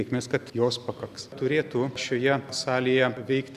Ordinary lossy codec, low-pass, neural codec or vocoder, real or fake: Opus, 64 kbps; 14.4 kHz; none; real